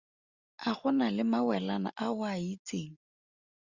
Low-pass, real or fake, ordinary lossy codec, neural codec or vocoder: 7.2 kHz; real; Opus, 64 kbps; none